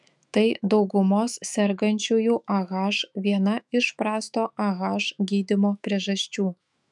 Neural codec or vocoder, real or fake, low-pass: autoencoder, 48 kHz, 128 numbers a frame, DAC-VAE, trained on Japanese speech; fake; 10.8 kHz